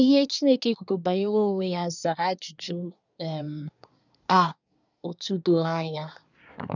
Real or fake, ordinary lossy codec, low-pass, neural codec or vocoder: fake; none; 7.2 kHz; codec, 24 kHz, 1 kbps, SNAC